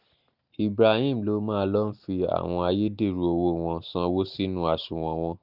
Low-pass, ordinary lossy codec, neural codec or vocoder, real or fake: 5.4 kHz; none; none; real